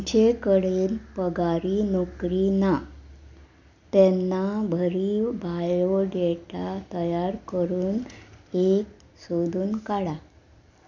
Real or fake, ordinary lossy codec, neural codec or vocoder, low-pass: real; none; none; 7.2 kHz